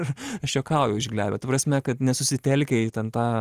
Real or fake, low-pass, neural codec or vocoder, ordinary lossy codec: real; 14.4 kHz; none; Opus, 24 kbps